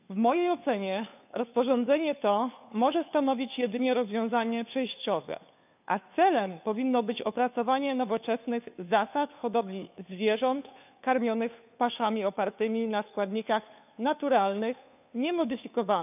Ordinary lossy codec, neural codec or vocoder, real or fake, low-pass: none; codec, 16 kHz, 2 kbps, FunCodec, trained on Chinese and English, 25 frames a second; fake; 3.6 kHz